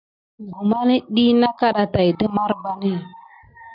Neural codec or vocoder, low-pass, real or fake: none; 5.4 kHz; real